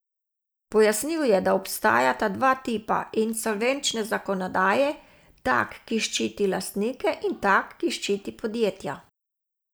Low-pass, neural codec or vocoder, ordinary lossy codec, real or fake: none; none; none; real